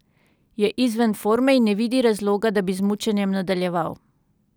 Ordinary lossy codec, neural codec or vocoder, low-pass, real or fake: none; none; none; real